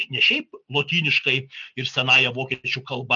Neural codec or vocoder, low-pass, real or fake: none; 7.2 kHz; real